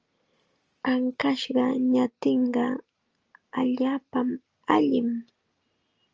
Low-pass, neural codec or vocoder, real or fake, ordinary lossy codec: 7.2 kHz; none; real; Opus, 24 kbps